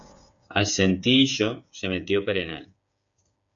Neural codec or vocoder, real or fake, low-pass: codec, 16 kHz, 8 kbps, FreqCodec, smaller model; fake; 7.2 kHz